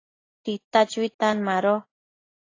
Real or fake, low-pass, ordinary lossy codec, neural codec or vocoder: real; 7.2 kHz; AAC, 32 kbps; none